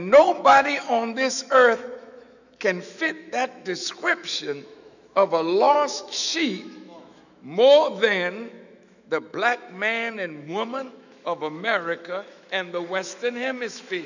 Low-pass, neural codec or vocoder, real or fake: 7.2 kHz; autoencoder, 48 kHz, 128 numbers a frame, DAC-VAE, trained on Japanese speech; fake